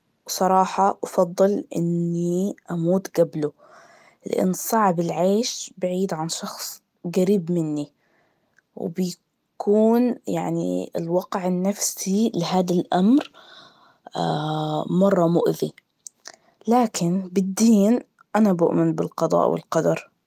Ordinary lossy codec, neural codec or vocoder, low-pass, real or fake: Opus, 32 kbps; none; 14.4 kHz; real